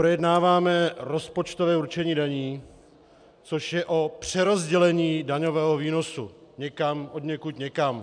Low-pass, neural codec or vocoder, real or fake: 9.9 kHz; none; real